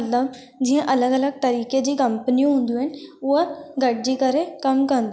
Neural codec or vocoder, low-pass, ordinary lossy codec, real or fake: none; none; none; real